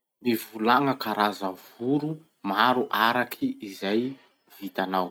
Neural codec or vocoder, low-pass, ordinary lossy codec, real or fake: none; none; none; real